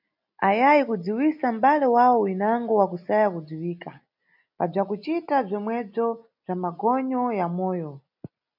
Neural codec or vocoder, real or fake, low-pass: none; real; 5.4 kHz